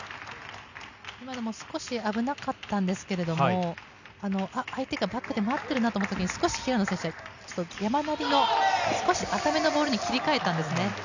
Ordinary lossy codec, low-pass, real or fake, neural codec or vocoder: none; 7.2 kHz; real; none